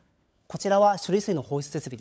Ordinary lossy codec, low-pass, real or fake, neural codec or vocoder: none; none; fake; codec, 16 kHz, 16 kbps, FunCodec, trained on LibriTTS, 50 frames a second